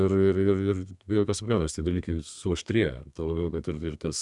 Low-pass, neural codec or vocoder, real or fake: 10.8 kHz; codec, 32 kHz, 1.9 kbps, SNAC; fake